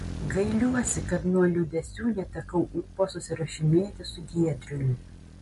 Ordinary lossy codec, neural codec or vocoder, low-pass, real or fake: MP3, 48 kbps; none; 14.4 kHz; real